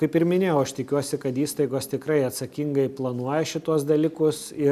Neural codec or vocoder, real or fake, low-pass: none; real; 14.4 kHz